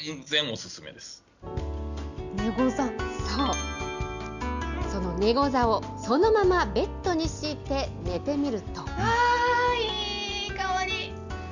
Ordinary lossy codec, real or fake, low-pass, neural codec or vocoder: none; real; 7.2 kHz; none